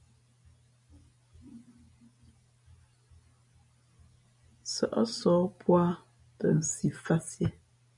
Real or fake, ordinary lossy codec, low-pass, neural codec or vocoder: real; MP3, 96 kbps; 10.8 kHz; none